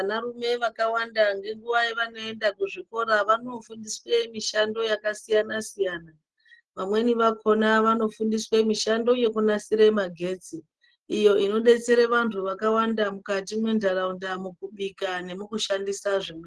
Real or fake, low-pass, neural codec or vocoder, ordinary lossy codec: real; 10.8 kHz; none; Opus, 16 kbps